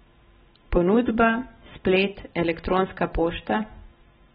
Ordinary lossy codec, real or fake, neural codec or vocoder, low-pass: AAC, 16 kbps; real; none; 7.2 kHz